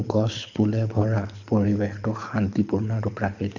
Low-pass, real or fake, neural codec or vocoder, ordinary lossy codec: 7.2 kHz; fake; codec, 24 kHz, 6 kbps, HILCodec; none